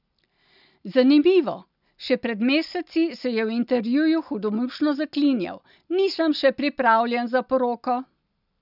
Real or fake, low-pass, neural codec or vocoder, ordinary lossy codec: real; 5.4 kHz; none; none